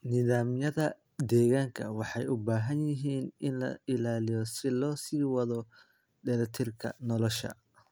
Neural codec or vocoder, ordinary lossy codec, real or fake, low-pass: none; none; real; none